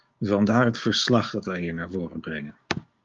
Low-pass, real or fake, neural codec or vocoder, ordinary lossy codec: 7.2 kHz; fake; codec, 16 kHz, 6 kbps, DAC; Opus, 32 kbps